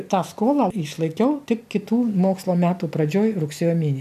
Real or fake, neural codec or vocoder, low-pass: real; none; 14.4 kHz